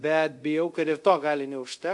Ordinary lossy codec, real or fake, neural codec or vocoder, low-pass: AAC, 64 kbps; fake; codec, 24 kHz, 0.5 kbps, DualCodec; 10.8 kHz